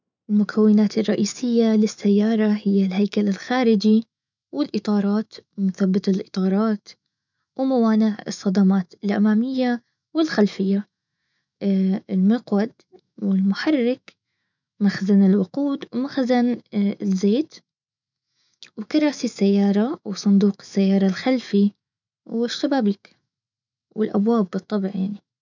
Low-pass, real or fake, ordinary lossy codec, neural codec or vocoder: 7.2 kHz; fake; none; autoencoder, 48 kHz, 128 numbers a frame, DAC-VAE, trained on Japanese speech